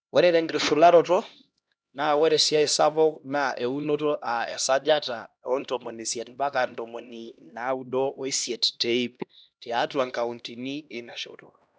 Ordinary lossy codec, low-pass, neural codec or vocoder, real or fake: none; none; codec, 16 kHz, 1 kbps, X-Codec, HuBERT features, trained on LibriSpeech; fake